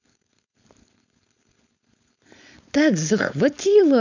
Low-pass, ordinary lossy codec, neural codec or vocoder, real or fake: 7.2 kHz; none; codec, 16 kHz, 4.8 kbps, FACodec; fake